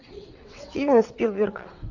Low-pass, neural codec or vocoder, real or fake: 7.2 kHz; vocoder, 22.05 kHz, 80 mel bands, WaveNeXt; fake